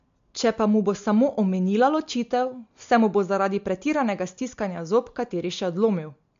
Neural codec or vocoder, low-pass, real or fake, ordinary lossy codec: none; 7.2 kHz; real; MP3, 48 kbps